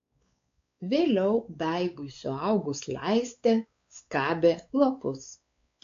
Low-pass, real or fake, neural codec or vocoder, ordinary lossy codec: 7.2 kHz; fake; codec, 16 kHz, 4 kbps, X-Codec, WavLM features, trained on Multilingual LibriSpeech; AAC, 48 kbps